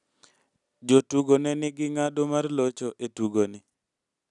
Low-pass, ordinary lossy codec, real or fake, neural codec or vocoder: 10.8 kHz; none; real; none